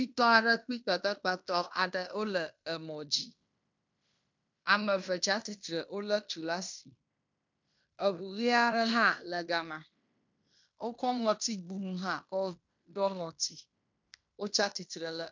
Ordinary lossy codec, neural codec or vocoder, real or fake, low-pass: MP3, 64 kbps; codec, 16 kHz in and 24 kHz out, 0.9 kbps, LongCat-Audio-Codec, fine tuned four codebook decoder; fake; 7.2 kHz